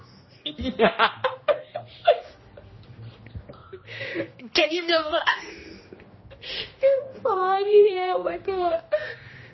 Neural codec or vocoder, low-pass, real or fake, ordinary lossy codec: codec, 16 kHz, 1 kbps, X-Codec, HuBERT features, trained on general audio; 7.2 kHz; fake; MP3, 24 kbps